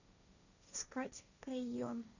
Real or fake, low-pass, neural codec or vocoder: fake; 7.2 kHz; codec, 16 kHz, 1.1 kbps, Voila-Tokenizer